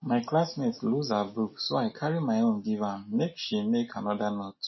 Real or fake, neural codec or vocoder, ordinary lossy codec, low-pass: real; none; MP3, 24 kbps; 7.2 kHz